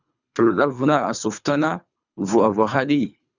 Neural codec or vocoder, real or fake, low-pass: codec, 24 kHz, 3 kbps, HILCodec; fake; 7.2 kHz